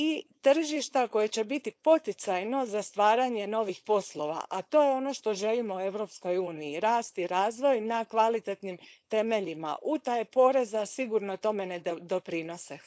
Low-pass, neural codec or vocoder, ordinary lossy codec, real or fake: none; codec, 16 kHz, 4.8 kbps, FACodec; none; fake